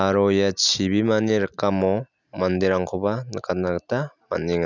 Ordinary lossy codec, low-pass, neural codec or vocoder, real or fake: none; 7.2 kHz; none; real